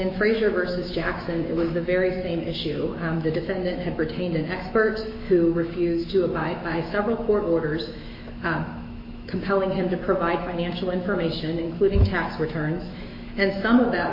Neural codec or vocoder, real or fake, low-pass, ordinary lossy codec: autoencoder, 48 kHz, 128 numbers a frame, DAC-VAE, trained on Japanese speech; fake; 5.4 kHz; MP3, 32 kbps